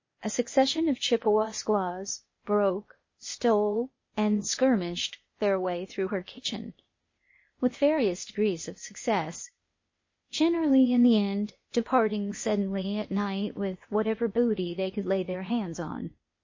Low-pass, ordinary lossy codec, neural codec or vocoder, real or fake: 7.2 kHz; MP3, 32 kbps; codec, 16 kHz, 0.8 kbps, ZipCodec; fake